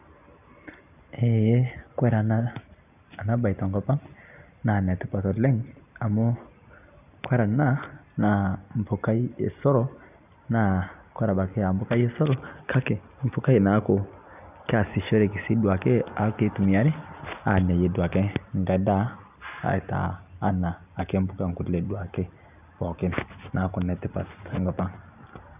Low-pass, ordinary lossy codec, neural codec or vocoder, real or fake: 3.6 kHz; none; none; real